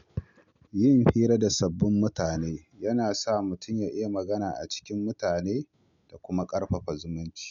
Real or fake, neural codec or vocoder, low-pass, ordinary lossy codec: real; none; 7.2 kHz; none